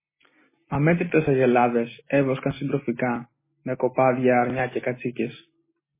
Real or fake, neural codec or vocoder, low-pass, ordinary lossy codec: real; none; 3.6 kHz; MP3, 16 kbps